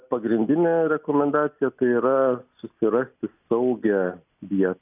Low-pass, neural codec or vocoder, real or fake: 3.6 kHz; none; real